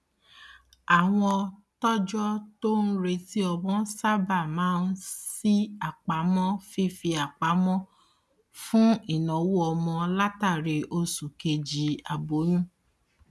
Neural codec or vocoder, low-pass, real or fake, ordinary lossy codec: none; none; real; none